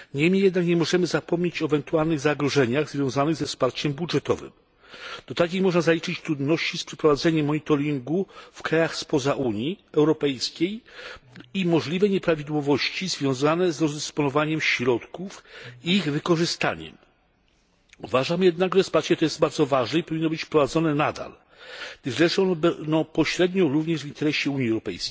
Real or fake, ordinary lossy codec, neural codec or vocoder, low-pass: real; none; none; none